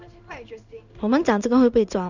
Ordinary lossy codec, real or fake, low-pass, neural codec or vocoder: none; fake; 7.2 kHz; codec, 16 kHz in and 24 kHz out, 1 kbps, XY-Tokenizer